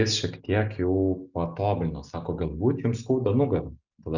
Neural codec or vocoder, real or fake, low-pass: none; real; 7.2 kHz